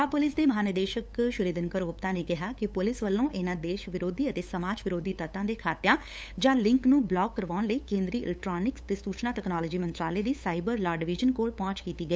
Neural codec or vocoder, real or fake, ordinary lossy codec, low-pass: codec, 16 kHz, 8 kbps, FunCodec, trained on LibriTTS, 25 frames a second; fake; none; none